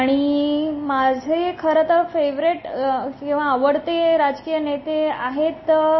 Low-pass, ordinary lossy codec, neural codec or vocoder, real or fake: 7.2 kHz; MP3, 24 kbps; none; real